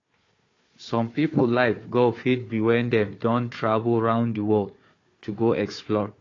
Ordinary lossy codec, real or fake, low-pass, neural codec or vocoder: AAC, 32 kbps; fake; 7.2 kHz; codec, 16 kHz, 4 kbps, FunCodec, trained on Chinese and English, 50 frames a second